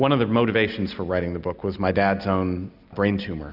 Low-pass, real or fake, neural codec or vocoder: 5.4 kHz; real; none